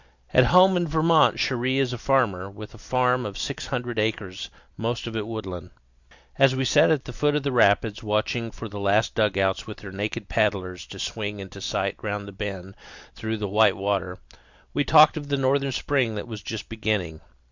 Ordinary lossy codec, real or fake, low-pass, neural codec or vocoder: Opus, 64 kbps; real; 7.2 kHz; none